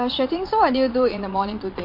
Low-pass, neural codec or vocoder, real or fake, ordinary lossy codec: 5.4 kHz; vocoder, 22.05 kHz, 80 mel bands, Vocos; fake; MP3, 32 kbps